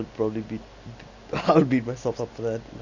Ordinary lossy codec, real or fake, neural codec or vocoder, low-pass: none; real; none; 7.2 kHz